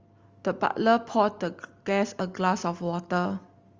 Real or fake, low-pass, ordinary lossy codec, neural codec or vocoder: real; 7.2 kHz; Opus, 32 kbps; none